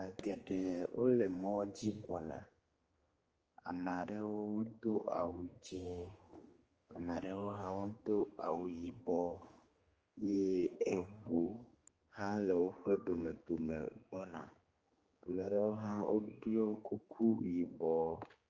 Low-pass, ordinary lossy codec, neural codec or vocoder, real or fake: 7.2 kHz; Opus, 24 kbps; codec, 16 kHz, 2 kbps, X-Codec, HuBERT features, trained on general audio; fake